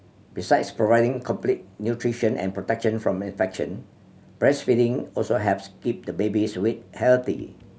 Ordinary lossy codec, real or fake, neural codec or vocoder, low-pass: none; real; none; none